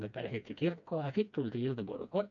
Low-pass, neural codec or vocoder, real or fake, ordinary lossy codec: 7.2 kHz; codec, 16 kHz, 2 kbps, FreqCodec, smaller model; fake; none